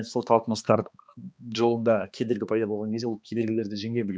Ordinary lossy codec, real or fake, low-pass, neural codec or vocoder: none; fake; none; codec, 16 kHz, 2 kbps, X-Codec, HuBERT features, trained on balanced general audio